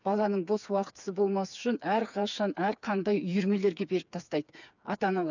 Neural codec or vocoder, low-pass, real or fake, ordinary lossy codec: codec, 16 kHz, 4 kbps, FreqCodec, smaller model; 7.2 kHz; fake; none